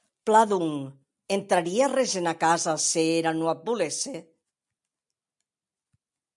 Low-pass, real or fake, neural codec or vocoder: 10.8 kHz; real; none